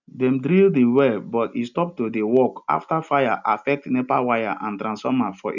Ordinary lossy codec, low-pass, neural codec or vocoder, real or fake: none; 7.2 kHz; none; real